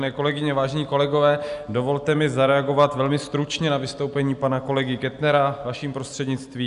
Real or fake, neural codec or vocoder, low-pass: real; none; 10.8 kHz